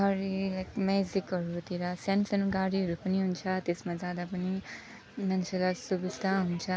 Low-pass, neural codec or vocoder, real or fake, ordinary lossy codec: none; none; real; none